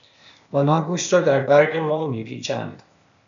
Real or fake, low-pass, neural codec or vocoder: fake; 7.2 kHz; codec, 16 kHz, 0.8 kbps, ZipCodec